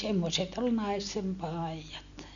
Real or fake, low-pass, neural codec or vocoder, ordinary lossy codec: real; 7.2 kHz; none; none